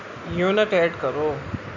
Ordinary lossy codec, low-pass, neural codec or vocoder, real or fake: none; 7.2 kHz; none; real